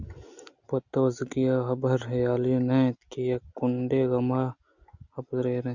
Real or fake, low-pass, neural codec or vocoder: real; 7.2 kHz; none